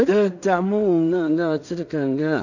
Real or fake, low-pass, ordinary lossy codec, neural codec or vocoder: fake; 7.2 kHz; none; codec, 16 kHz in and 24 kHz out, 0.4 kbps, LongCat-Audio-Codec, two codebook decoder